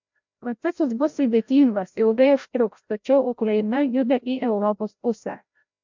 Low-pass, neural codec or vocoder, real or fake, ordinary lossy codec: 7.2 kHz; codec, 16 kHz, 0.5 kbps, FreqCodec, larger model; fake; MP3, 64 kbps